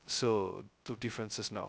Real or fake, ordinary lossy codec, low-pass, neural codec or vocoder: fake; none; none; codec, 16 kHz, 0.2 kbps, FocalCodec